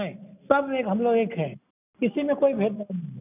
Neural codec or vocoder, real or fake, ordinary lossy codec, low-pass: none; real; none; 3.6 kHz